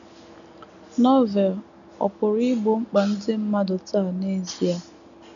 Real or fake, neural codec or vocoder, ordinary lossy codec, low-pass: real; none; none; 7.2 kHz